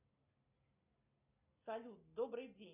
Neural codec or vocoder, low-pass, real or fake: none; 3.6 kHz; real